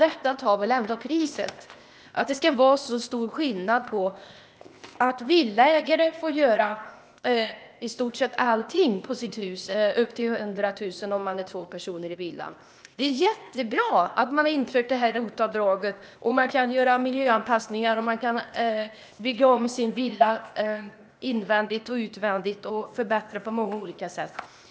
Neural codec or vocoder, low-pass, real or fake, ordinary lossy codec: codec, 16 kHz, 0.8 kbps, ZipCodec; none; fake; none